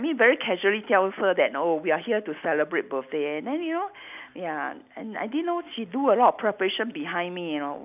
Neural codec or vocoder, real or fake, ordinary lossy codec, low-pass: none; real; none; 3.6 kHz